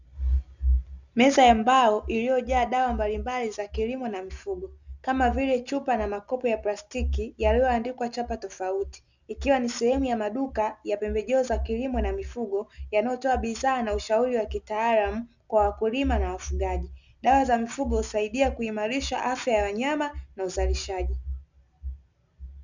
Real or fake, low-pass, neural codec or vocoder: real; 7.2 kHz; none